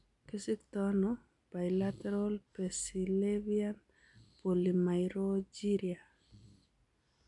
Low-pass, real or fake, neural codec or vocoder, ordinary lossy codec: 10.8 kHz; real; none; none